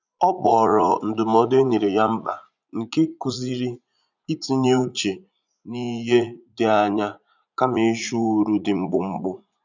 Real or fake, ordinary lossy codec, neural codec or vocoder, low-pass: fake; none; vocoder, 44.1 kHz, 80 mel bands, Vocos; 7.2 kHz